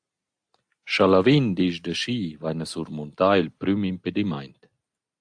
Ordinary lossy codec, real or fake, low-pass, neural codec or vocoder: AAC, 64 kbps; real; 9.9 kHz; none